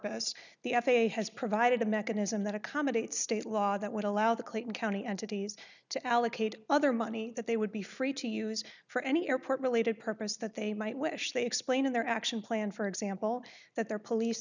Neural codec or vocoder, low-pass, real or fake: none; 7.2 kHz; real